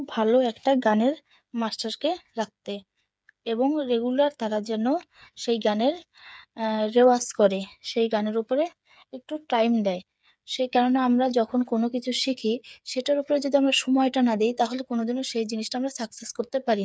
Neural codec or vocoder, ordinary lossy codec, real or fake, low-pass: codec, 16 kHz, 8 kbps, FreqCodec, smaller model; none; fake; none